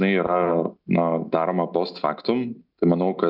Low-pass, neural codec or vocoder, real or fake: 5.4 kHz; none; real